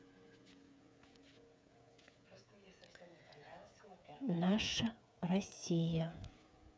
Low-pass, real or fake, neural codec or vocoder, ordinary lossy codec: none; fake; codec, 16 kHz, 8 kbps, FreqCodec, smaller model; none